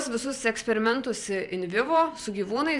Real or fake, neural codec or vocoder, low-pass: fake; vocoder, 44.1 kHz, 128 mel bands every 512 samples, BigVGAN v2; 10.8 kHz